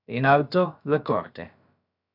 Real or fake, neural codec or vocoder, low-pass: fake; codec, 16 kHz, about 1 kbps, DyCAST, with the encoder's durations; 5.4 kHz